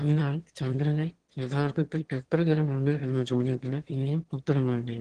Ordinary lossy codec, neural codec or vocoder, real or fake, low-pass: Opus, 16 kbps; autoencoder, 22.05 kHz, a latent of 192 numbers a frame, VITS, trained on one speaker; fake; 9.9 kHz